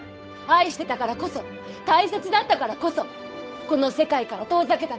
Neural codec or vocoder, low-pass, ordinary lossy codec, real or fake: codec, 16 kHz, 8 kbps, FunCodec, trained on Chinese and English, 25 frames a second; none; none; fake